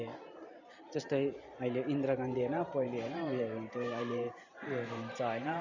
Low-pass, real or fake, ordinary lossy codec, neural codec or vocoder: 7.2 kHz; fake; none; vocoder, 44.1 kHz, 128 mel bands every 512 samples, BigVGAN v2